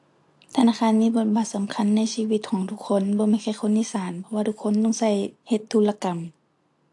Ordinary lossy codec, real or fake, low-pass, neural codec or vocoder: AAC, 64 kbps; real; 10.8 kHz; none